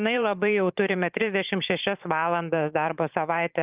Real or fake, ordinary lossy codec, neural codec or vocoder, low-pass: fake; Opus, 24 kbps; vocoder, 44.1 kHz, 128 mel bands every 512 samples, BigVGAN v2; 3.6 kHz